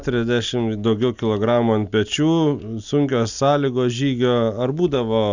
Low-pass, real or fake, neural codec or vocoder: 7.2 kHz; real; none